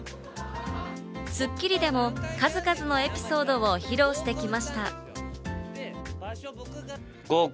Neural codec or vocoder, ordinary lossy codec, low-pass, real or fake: none; none; none; real